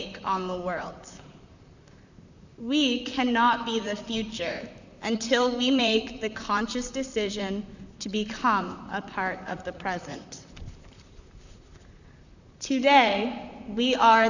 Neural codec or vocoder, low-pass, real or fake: vocoder, 44.1 kHz, 128 mel bands, Pupu-Vocoder; 7.2 kHz; fake